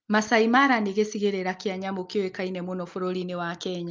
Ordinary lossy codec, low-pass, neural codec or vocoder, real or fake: Opus, 24 kbps; 7.2 kHz; none; real